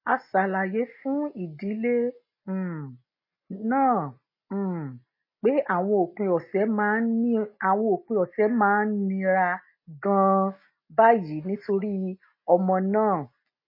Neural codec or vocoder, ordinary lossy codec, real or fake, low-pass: none; MP3, 24 kbps; real; 5.4 kHz